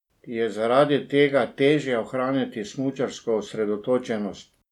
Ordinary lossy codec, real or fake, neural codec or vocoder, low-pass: none; real; none; 19.8 kHz